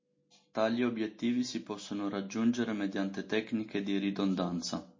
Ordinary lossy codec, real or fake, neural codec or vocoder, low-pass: MP3, 32 kbps; real; none; 7.2 kHz